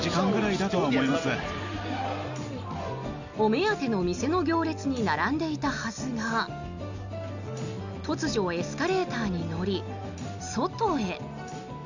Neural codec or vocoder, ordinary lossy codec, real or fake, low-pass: none; none; real; 7.2 kHz